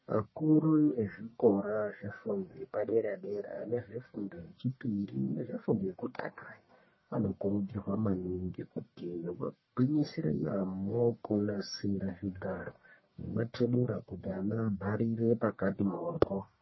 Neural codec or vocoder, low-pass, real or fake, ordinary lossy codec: codec, 44.1 kHz, 1.7 kbps, Pupu-Codec; 7.2 kHz; fake; MP3, 24 kbps